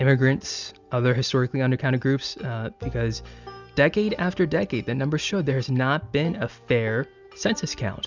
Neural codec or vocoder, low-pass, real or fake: none; 7.2 kHz; real